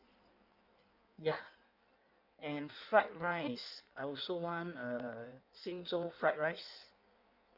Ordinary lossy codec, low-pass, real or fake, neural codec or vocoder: none; 5.4 kHz; fake; codec, 16 kHz in and 24 kHz out, 1.1 kbps, FireRedTTS-2 codec